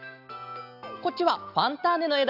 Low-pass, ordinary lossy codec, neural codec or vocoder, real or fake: 5.4 kHz; none; none; real